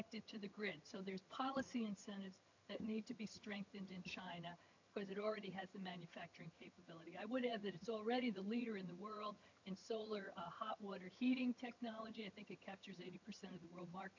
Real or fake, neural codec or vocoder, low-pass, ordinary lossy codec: fake; vocoder, 22.05 kHz, 80 mel bands, HiFi-GAN; 7.2 kHz; MP3, 48 kbps